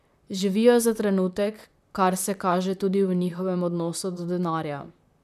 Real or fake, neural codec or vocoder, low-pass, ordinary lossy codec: fake; vocoder, 44.1 kHz, 128 mel bands, Pupu-Vocoder; 14.4 kHz; none